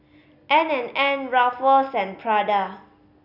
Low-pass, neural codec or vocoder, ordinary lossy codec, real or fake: 5.4 kHz; none; none; real